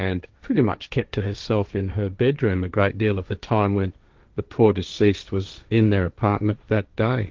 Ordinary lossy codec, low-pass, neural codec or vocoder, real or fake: Opus, 24 kbps; 7.2 kHz; codec, 16 kHz, 1.1 kbps, Voila-Tokenizer; fake